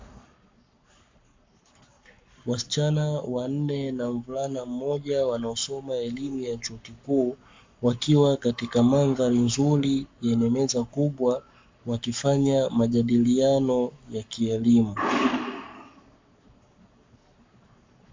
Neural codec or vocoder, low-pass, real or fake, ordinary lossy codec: codec, 44.1 kHz, 7.8 kbps, Pupu-Codec; 7.2 kHz; fake; MP3, 64 kbps